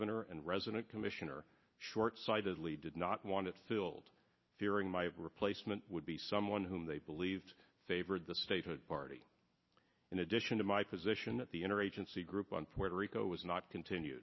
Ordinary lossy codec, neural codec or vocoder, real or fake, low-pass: MP3, 24 kbps; none; real; 7.2 kHz